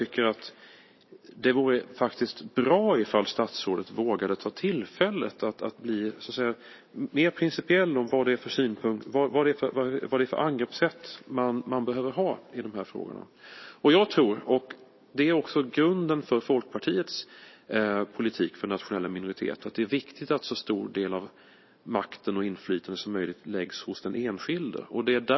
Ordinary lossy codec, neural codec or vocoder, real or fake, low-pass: MP3, 24 kbps; none; real; 7.2 kHz